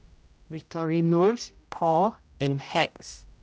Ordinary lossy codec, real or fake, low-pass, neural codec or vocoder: none; fake; none; codec, 16 kHz, 0.5 kbps, X-Codec, HuBERT features, trained on general audio